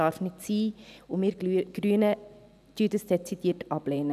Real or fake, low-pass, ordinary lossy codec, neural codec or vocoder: real; 14.4 kHz; none; none